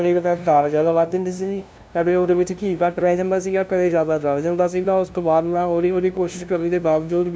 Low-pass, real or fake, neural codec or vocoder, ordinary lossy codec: none; fake; codec, 16 kHz, 0.5 kbps, FunCodec, trained on LibriTTS, 25 frames a second; none